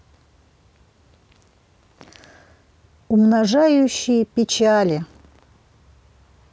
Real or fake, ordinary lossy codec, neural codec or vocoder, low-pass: real; none; none; none